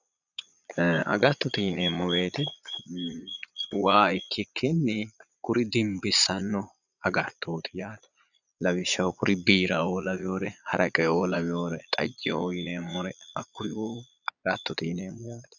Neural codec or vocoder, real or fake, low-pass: vocoder, 22.05 kHz, 80 mel bands, Vocos; fake; 7.2 kHz